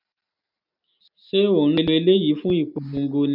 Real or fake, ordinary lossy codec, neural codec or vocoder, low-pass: real; none; none; 5.4 kHz